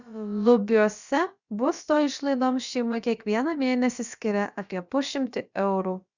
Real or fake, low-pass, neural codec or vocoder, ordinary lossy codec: fake; 7.2 kHz; codec, 16 kHz, about 1 kbps, DyCAST, with the encoder's durations; Opus, 64 kbps